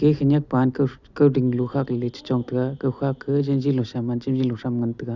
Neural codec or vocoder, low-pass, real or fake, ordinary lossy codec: none; 7.2 kHz; real; none